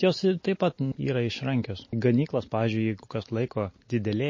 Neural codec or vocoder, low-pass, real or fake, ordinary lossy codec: none; 7.2 kHz; real; MP3, 32 kbps